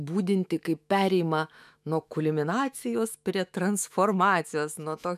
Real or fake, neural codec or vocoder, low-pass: fake; autoencoder, 48 kHz, 128 numbers a frame, DAC-VAE, trained on Japanese speech; 14.4 kHz